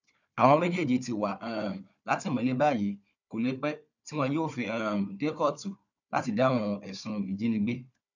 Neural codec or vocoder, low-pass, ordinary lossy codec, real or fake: codec, 16 kHz, 4 kbps, FunCodec, trained on Chinese and English, 50 frames a second; 7.2 kHz; none; fake